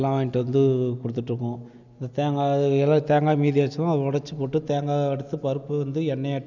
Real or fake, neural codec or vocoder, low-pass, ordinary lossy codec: real; none; 7.2 kHz; none